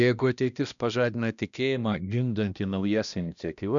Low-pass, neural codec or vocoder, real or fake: 7.2 kHz; codec, 16 kHz, 1 kbps, X-Codec, HuBERT features, trained on balanced general audio; fake